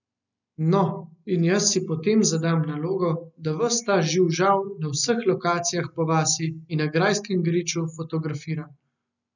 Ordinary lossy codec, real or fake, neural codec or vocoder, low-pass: none; real; none; 7.2 kHz